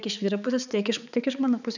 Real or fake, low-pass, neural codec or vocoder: fake; 7.2 kHz; codec, 16 kHz, 4 kbps, X-Codec, HuBERT features, trained on balanced general audio